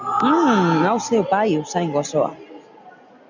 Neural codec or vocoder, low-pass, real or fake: none; 7.2 kHz; real